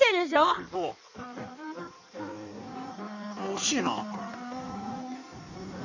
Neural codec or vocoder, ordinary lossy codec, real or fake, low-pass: codec, 16 kHz in and 24 kHz out, 1.1 kbps, FireRedTTS-2 codec; none; fake; 7.2 kHz